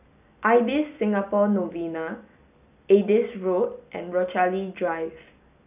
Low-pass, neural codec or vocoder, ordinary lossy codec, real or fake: 3.6 kHz; none; none; real